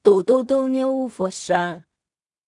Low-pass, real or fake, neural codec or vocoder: 10.8 kHz; fake; codec, 16 kHz in and 24 kHz out, 0.4 kbps, LongCat-Audio-Codec, two codebook decoder